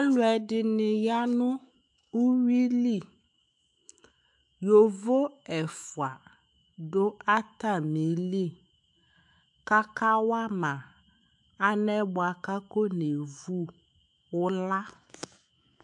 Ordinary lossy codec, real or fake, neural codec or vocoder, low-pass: AAC, 64 kbps; fake; autoencoder, 48 kHz, 128 numbers a frame, DAC-VAE, trained on Japanese speech; 10.8 kHz